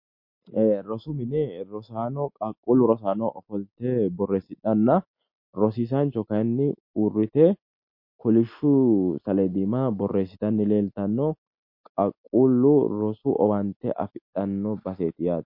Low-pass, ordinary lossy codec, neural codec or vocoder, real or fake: 5.4 kHz; MP3, 32 kbps; none; real